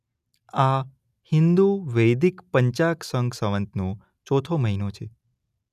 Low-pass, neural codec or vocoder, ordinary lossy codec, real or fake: 14.4 kHz; none; none; real